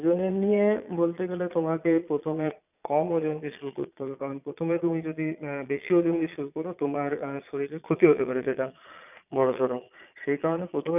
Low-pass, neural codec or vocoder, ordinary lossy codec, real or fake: 3.6 kHz; vocoder, 22.05 kHz, 80 mel bands, Vocos; none; fake